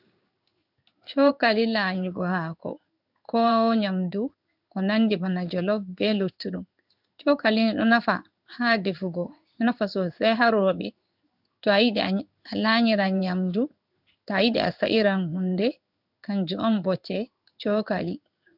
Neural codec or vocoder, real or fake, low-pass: codec, 16 kHz in and 24 kHz out, 1 kbps, XY-Tokenizer; fake; 5.4 kHz